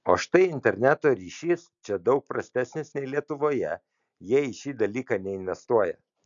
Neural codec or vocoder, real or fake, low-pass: none; real; 7.2 kHz